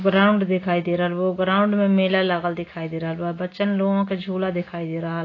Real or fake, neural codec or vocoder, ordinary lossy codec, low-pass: real; none; AAC, 32 kbps; 7.2 kHz